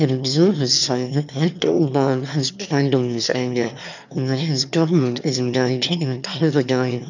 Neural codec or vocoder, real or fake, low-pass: autoencoder, 22.05 kHz, a latent of 192 numbers a frame, VITS, trained on one speaker; fake; 7.2 kHz